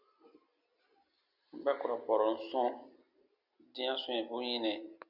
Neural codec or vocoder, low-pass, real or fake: none; 5.4 kHz; real